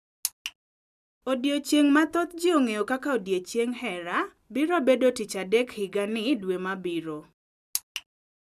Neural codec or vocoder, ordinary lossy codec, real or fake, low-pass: none; none; real; 14.4 kHz